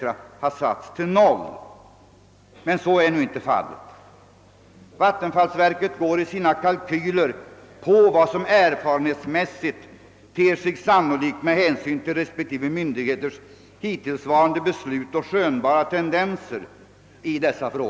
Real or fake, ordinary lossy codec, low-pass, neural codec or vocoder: real; none; none; none